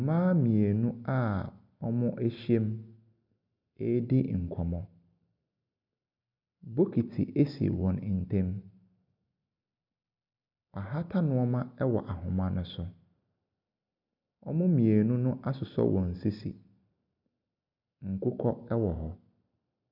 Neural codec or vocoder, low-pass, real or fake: none; 5.4 kHz; real